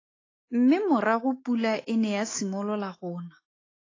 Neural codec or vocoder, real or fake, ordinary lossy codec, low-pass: autoencoder, 48 kHz, 128 numbers a frame, DAC-VAE, trained on Japanese speech; fake; AAC, 32 kbps; 7.2 kHz